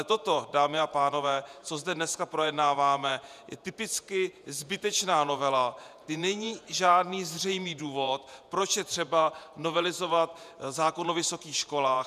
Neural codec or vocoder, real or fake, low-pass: vocoder, 48 kHz, 128 mel bands, Vocos; fake; 14.4 kHz